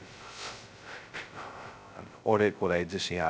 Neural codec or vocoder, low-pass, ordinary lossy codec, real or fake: codec, 16 kHz, 0.2 kbps, FocalCodec; none; none; fake